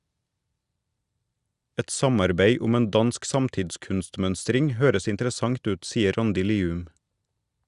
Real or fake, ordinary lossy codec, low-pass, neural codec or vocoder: real; Opus, 64 kbps; 10.8 kHz; none